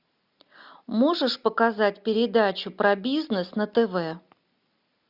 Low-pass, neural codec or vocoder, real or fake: 5.4 kHz; none; real